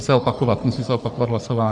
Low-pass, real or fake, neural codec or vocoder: 10.8 kHz; fake; codec, 44.1 kHz, 3.4 kbps, Pupu-Codec